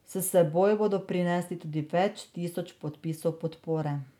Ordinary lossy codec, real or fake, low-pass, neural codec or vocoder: none; real; 19.8 kHz; none